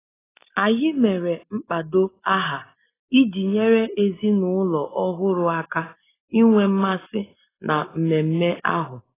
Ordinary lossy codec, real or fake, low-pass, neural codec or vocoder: AAC, 16 kbps; real; 3.6 kHz; none